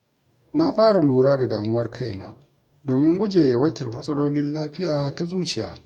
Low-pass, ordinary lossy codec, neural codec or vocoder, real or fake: 19.8 kHz; none; codec, 44.1 kHz, 2.6 kbps, DAC; fake